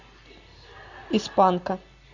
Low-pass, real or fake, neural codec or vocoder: 7.2 kHz; real; none